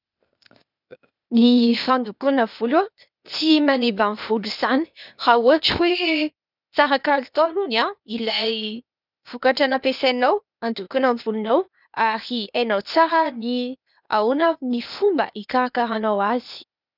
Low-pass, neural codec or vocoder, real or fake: 5.4 kHz; codec, 16 kHz, 0.8 kbps, ZipCodec; fake